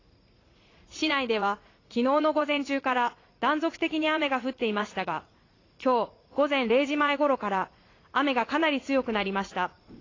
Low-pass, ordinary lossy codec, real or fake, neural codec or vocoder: 7.2 kHz; AAC, 32 kbps; fake; vocoder, 44.1 kHz, 80 mel bands, Vocos